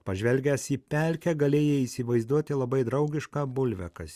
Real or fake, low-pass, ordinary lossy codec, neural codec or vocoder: real; 14.4 kHz; Opus, 64 kbps; none